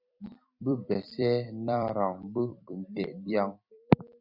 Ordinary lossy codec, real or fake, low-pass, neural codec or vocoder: Opus, 64 kbps; fake; 5.4 kHz; vocoder, 44.1 kHz, 128 mel bands every 256 samples, BigVGAN v2